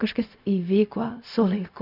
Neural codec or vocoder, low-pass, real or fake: codec, 16 kHz, 0.4 kbps, LongCat-Audio-Codec; 5.4 kHz; fake